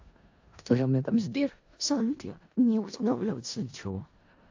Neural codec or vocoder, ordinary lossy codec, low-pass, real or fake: codec, 16 kHz in and 24 kHz out, 0.4 kbps, LongCat-Audio-Codec, four codebook decoder; AAC, 48 kbps; 7.2 kHz; fake